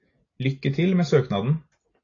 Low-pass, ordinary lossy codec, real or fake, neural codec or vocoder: 7.2 kHz; AAC, 32 kbps; real; none